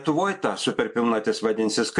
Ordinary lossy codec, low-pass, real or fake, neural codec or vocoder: MP3, 64 kbps; 10.8 kHz; real; none